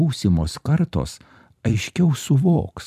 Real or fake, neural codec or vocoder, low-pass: fake; vocoder, 44.1 kHz, 128 mel bands every 256 samples, BigVGAN v2; 14.4 kHz